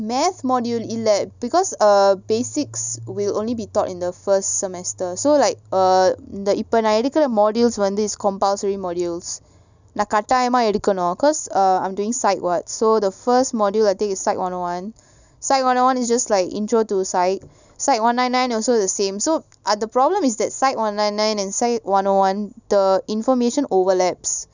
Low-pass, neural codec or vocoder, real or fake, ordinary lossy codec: 7.2 kHz; none; real; none